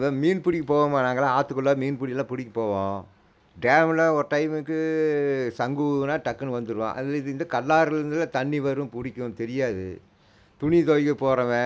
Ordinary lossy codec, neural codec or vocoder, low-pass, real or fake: none; none; none; real